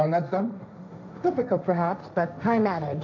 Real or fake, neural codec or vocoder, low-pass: fake; codec, 16 kHz, 1.1 kbps, Voila-Tokenizer; 7.2 kHz